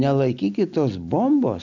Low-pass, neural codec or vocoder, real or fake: 7.2 kHz; none; real